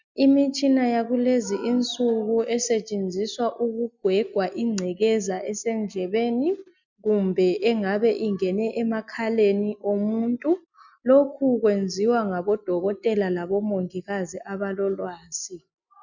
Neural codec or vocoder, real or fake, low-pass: none; real; 7.2 kHz